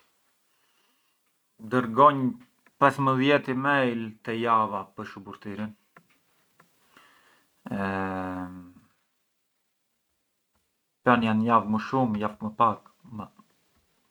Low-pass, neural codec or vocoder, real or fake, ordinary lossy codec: 19.8 kHz; none; real; none